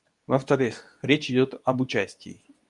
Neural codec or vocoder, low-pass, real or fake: codec, 24 kHz, 0.9 kbps, WavTokenizer, medium speech release version 2; 10.8 kHz; fake